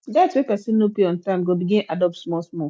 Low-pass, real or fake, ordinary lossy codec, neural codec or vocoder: none; real; none; none